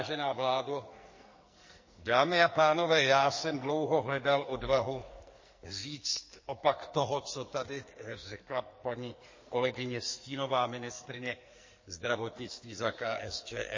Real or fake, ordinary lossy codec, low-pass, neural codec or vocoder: fake; MP3, 32 kbps; 7.2 kHz; codec, 44.1 kHz, 2.6 kbps, SNAC